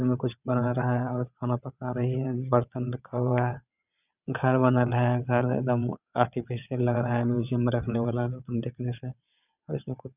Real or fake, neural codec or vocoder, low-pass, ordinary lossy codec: fake; vocoder, 22.05 kHz, 80 mel bands, WaveNeXt; 3.6 kHz; none